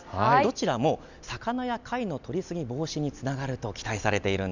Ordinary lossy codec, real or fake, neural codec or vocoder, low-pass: none; real; none; 7.2 kHz